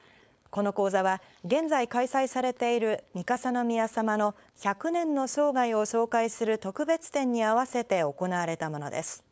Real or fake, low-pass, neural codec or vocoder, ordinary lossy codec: fake; none; codec, 16 kHz, 4.8 kbps, FACodec; none